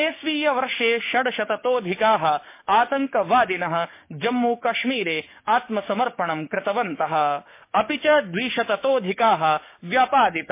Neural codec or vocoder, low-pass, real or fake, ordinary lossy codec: codec, 16 kHz, 6 kbps, DAC; 3.6 kHz; fake; MP3, 24 kbps